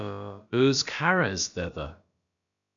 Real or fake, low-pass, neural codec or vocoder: fake; 7.2 kHz; codec, 16 kHz, about 1 kbps, DyCAST, with the encoder's durations